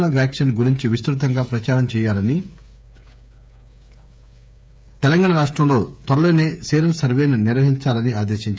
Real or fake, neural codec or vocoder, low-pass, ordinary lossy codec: fake; codec, 16 kHz, 8 kbps, FreqCodec, smaller model; none; none